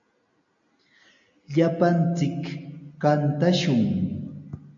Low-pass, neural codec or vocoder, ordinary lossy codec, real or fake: 7.2 kHz; none; MP3, 48 kbps; real